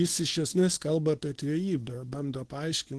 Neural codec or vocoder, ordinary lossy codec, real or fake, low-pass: codec, 24 kHz, 0.9 kbps, WavTokenizer, medium speech release version 2; Opus, 16 kbps; fake; 10.8 kHz